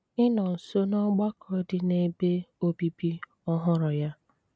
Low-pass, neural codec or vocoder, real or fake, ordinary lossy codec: none; none; real; none